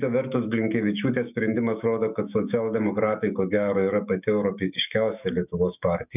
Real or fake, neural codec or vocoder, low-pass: fake; autoencoder, 48 kHz, 128 numbers a frame, DAC-VAE, trained on Japanese speech; 3.6 kHz